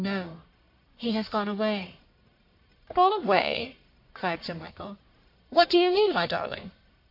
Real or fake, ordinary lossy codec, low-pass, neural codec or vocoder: fake; MP3, 32 kbps; 5.4 kHz; codec, 44.1 kHz, 1.7 kbps, Pupu-Codec